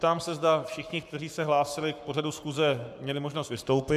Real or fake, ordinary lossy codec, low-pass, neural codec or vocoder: fake; AAC, 96 kbps; 14.4 kHz; codec, 44.1 kHz, 7.8 kbps, Pupu-Codec